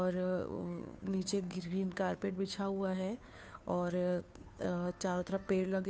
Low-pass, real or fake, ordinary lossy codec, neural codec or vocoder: none; fake; none; codec, 16 kHz, 2 kbps, FunCodec, trained on Chinese and English, 25 frames a second